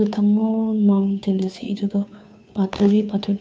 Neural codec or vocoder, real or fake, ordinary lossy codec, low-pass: codec, 16 kHz, 2 kbps, FunCodec, trained on Chinese and English, 25 frames a second; fake; none; none